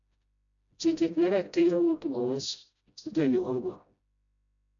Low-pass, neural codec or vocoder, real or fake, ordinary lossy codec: 7.2 kHz; codec, 16 kHz, 0.5 kbps, FreqCodec, smaller model; fake; none